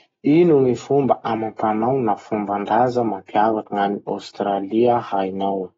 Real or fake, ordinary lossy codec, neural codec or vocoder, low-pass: real; AAC, 24 kbps; none; 7.2 kHz